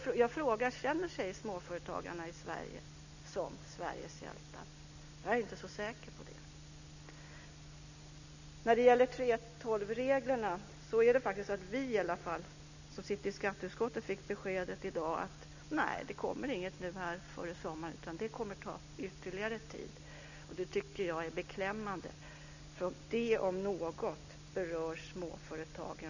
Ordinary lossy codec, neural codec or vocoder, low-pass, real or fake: none; none; 7.2 kHz; real